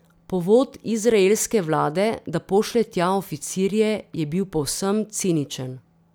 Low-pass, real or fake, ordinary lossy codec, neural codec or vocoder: none; real; none; none